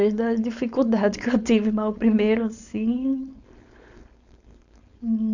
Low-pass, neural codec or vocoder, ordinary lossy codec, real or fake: 7.2 kHz; codec, 16 kHz, 4.8 kbps, FACodec; none; fake